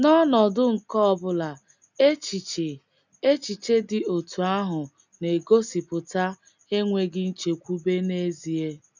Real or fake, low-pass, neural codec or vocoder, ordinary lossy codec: real; 7.2 kHz; none; none